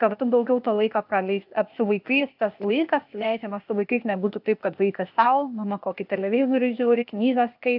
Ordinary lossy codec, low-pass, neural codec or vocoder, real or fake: AAC, 48 kbps; 5.4 kHz; codec, 16 kHz, 0.8 kbps, ZipCodec; fake